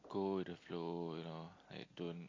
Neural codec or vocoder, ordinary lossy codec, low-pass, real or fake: none; AAC, 32 kbps; 7.2 kHz; real